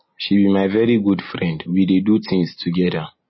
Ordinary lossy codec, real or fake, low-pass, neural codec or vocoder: MP3, 24 kbps; real; 7.2 kHz; none